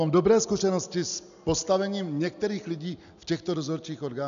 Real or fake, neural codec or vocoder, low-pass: real; none; 7.2 kHz